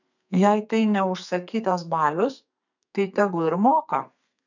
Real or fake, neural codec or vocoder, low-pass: fake; codec, 32 kHz, 1.9 kbps, SNAC; 7.2 kHz